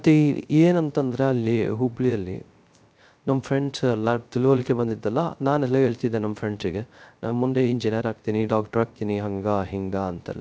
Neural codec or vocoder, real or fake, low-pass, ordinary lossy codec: codec, 16 kHz, 0.3 kbps, FocalCodec; fake; none; none